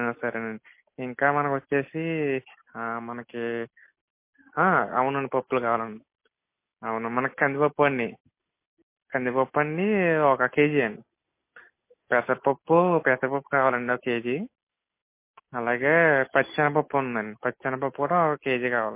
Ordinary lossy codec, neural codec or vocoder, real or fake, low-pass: MP3, 24 kbps; none; real; 3.6 kHz